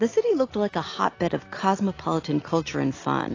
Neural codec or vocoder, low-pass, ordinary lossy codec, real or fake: none; 7.2 kHz; AAC, 32 kbps; real